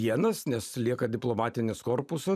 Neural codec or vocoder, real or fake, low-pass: vocoder, 44.1 kHz, 128 mel bands every 512 samples, BigVGAN v2; fake; 14.4 kHz